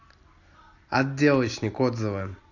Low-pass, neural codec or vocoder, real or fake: 7.2 kHz; none; real